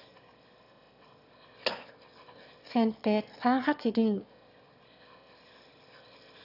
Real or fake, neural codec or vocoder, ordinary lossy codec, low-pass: fake; autoencoder, 22.05 kHz, a latent of 192 numbers a frame, VITS, trained on one speaker; none; 5.4 kHz